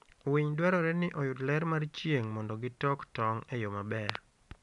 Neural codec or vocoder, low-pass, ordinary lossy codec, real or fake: none; 10.8 kHz; MP3, 96 kbps; real